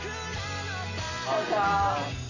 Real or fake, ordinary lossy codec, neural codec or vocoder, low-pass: real; none; none; 7.2 kHz